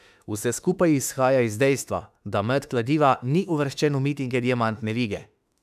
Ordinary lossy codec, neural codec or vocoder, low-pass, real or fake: none; autoencoder, 48 kHz, 32 numbers a frame, DAC-VAE, trained on Japanese speech; 14.4 kHz; fake